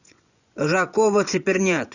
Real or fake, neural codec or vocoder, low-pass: fake; vocoder, 24 kHz, 100 mel bands, Vocos; 7.2 kHz